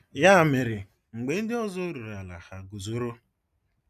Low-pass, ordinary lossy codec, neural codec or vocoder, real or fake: 14.4 kHz; none; none; real